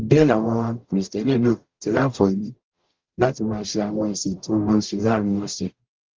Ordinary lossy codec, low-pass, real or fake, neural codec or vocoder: Opus, 24 kbps; 7.2 kHz; fake; codec, 44.1 kHz, 0.9 kbps, DAC